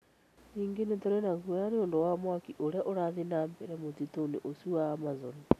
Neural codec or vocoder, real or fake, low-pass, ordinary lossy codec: none; real; 14.4 kHz; none